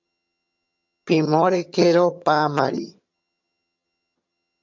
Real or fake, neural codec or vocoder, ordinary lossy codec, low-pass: fake; vocoder, 22.05 kHz, 80 mel bands, HiFi-GAN; MP3, 64 kbps; 7.2 kHz